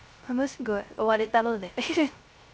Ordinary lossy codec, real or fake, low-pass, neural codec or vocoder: none; fake; none; codec, 16 kHz, 0.3 kbps, FocalCodec